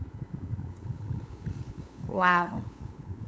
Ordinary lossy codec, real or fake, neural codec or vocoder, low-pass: none; fake; codec, 16 kHz, 8 kbps, FunCodec, trained on LibriTTS, 25 frames a second; none